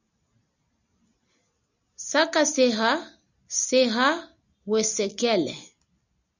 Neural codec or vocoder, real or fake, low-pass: none; real; 7.2 kHz